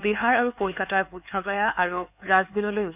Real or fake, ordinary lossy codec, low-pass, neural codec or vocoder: fake; none; 3.6 kHz; codec, 16 kHz, 4 kbps, X-Codec, HuBERT features, trained on LibriSpeech